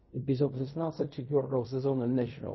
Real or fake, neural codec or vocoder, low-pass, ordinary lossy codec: fake; codec, 16 kHz in and 24 kHz out, 0.4 kbps, LongCat-Audio-Codec, fine tuned four codebook decoder; 7.2 kHz; MP3, 24 kbps